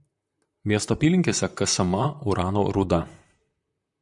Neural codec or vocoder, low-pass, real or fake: vocoder, 44.1 kHz, 128 mel bands, Pupu-Vocoder; 10.8 kHz; fake